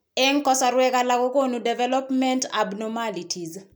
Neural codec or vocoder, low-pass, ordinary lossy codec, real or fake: none; none; none; real